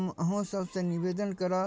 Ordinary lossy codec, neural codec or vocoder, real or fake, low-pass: none; none; real; none